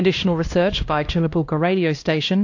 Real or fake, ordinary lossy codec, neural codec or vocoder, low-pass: fake; AAC, 48 kbps; codec, 16 kHz, 1 kbps, X-Codec, HuBERT features, trained on LibriSpeech; 7.2 kHz